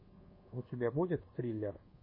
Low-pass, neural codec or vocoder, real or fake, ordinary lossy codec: 5.4 kHz; codec, 24 kHz, 1.2 kbps, DualCodec; fake; MP3, 24 kbps